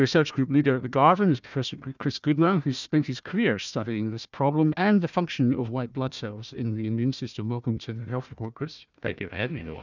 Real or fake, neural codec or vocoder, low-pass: fake; codec, 16 kHz, 1 kbps, FunCodec, trained on Chinese and English, 50 frames a second; 7.2 kHz